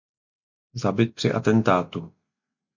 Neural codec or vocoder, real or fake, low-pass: none; real; 7.2 kHz